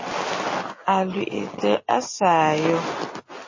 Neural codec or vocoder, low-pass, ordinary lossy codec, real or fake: none; 7.2 kHz; MP3, 32 kbps; real